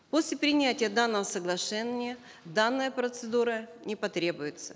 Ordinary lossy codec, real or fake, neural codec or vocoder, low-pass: none; real; none; none